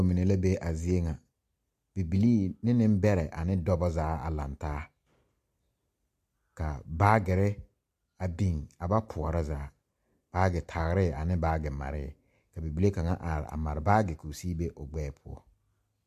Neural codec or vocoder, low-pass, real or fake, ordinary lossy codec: none; 14.4 kHz; real; MP3, 64 kbps